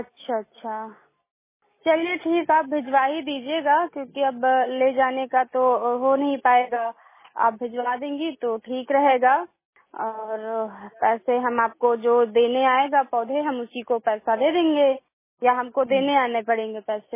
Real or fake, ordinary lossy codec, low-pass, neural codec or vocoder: real; MP3, 16 kbps; 3.6 kHz; none